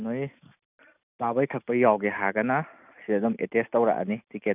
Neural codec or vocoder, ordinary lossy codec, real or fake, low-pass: none; none; real; 3.6 kHz